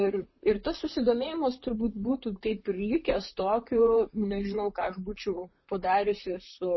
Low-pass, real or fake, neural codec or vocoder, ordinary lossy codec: 7.2 kHz; fake; vocoder, 44.1 kHz, 128 mel bands, Pupu-Vocoder; MP3, 24 kbps